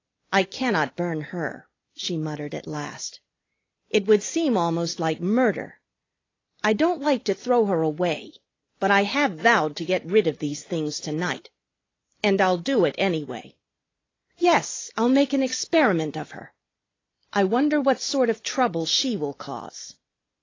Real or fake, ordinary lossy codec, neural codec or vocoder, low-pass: real; AAC, 32 kbps; none; 7.2 kHz